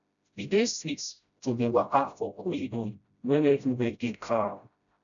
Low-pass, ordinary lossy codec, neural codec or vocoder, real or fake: 7.2 kHz; none; codec, 16 kHz, 0.5 kbps, FreqCodec, smaller model; fake